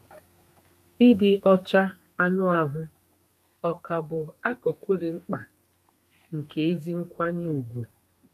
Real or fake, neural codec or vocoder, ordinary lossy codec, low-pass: fake; codec, 32 kHz, 1.9 kbps, SNAC; none; 14.4 kHz